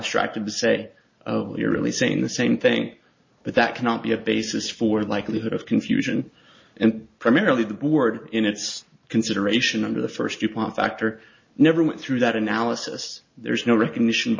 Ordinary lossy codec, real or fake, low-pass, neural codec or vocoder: MP3, 32 kbps; real; 7.2 kHz; none